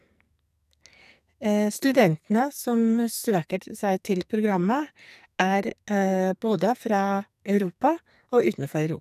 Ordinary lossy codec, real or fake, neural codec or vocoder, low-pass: none; fake; codec, 44.1 kHz, 2.6 kbps, SNAC; 14.4 kHz